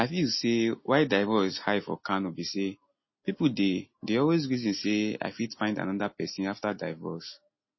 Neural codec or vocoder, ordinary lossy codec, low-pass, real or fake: none; MP3, 24 kbps; 7.2 kHz; real